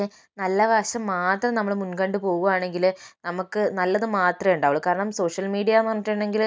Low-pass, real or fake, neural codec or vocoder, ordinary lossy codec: none; real; none; none